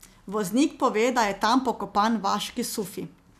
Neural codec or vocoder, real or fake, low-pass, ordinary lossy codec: none; real; 14.4 kHz; none